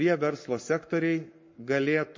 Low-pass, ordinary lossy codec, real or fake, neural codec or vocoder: 7.2 kHz; MP3, 32 kbps; fake; codec, 44.1 kHz, 7.8 kbps, Pupu-Codec